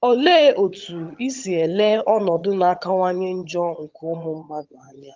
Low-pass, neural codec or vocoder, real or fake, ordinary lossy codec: 7.2 kHz; vocoder, 22.05 kHz, 80 mel bands, HiFi-GAN; fake; Opus, 32 kbps